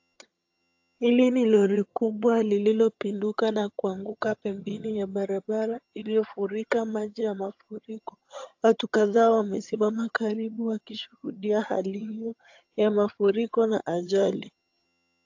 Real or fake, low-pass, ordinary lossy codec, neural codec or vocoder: fake; 7.2 kHz; AAC, 48 kbps; vocoder, 22.05 kHz, 80 mel bands, HiFi-GAN